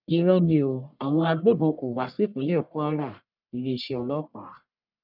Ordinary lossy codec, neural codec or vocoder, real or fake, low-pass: none; codec, 44.1 kHz, 1.7 kbps, Pupu-Codec; fake; 5.4 kHz